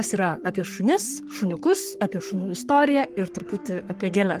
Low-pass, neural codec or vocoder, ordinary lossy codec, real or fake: 14.4 kHz; codec, 44.1 kHz, 3.4 kbps, Pupu-Codec; Opus, 24 kbps; fake